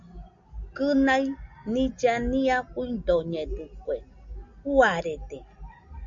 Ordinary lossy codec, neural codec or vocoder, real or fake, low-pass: MP3, 48 kbps; none; real; 7.2 kHz